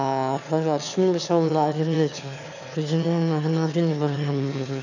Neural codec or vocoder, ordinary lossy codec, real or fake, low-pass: autoencoder, 22.05 kHz, a latent of 192 numbers a frame, VITS, trained on one speaker; none; fake; 7.2 kHz